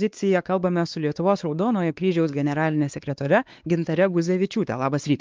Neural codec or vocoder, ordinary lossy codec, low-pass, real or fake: codec, 16 kHz, 2 kbps, X-Codec, WavLM features, trained on Multilingual LibriSpeech; Opus, 24 kbps; 7.2 kHz; fake